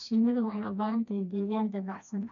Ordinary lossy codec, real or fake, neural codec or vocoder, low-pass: MP3, 64 kbps; fake; codec, 16 kHz, 1 kbps, FreqCodec, smaller model; 7.2 kHz